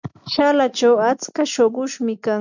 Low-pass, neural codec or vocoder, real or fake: 7.2 kHz; none; real